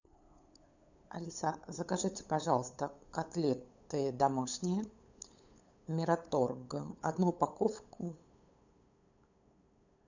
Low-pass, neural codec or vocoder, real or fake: 7.2 kHz; codec, 16 kHz, 8 kbps, FunCodec, trained on LibriTTS, 25 frames a second; fake